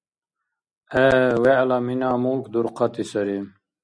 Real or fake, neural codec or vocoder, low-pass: real; none; 9.9 kHz